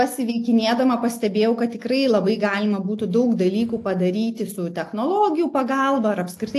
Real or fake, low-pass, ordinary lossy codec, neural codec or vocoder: real; 14.4 kHz; AAC, 64 kbps; none